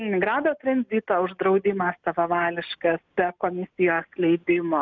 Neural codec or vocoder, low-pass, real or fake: none; 7.2 kHz; real